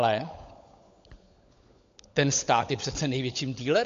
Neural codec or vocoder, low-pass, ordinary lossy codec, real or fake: codec, 16 kHz, 16 kbps, FunCodec, trained on LibriTTS, 50 frames a second; 7.2 kHz; AAC, 48 kbps; fake